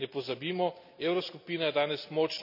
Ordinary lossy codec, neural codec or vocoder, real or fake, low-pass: none; none; real; 5.4 kHz